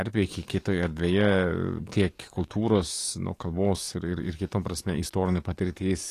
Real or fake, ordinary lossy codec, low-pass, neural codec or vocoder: fake; AAC, 48 kbps; 14.4 kHz; codec, 44.1 kHz, 7.8 kbps, DAC